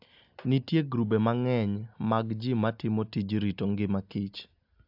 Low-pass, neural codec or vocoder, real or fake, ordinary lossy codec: 5.4 kHz; none; real; AAC, 48 kbps